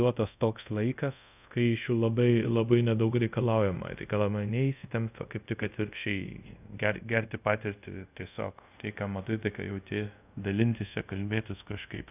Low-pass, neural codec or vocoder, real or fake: 3.6 kHz; codec, 24 kHz, 0.5 kbps, DualCodec; fake